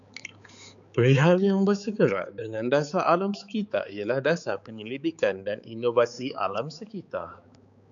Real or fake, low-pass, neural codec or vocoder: fake; 7.2 kHz; codec, 16 kHz, 4 kbps, X-Codec, HuBERT features, trained on balanced general audio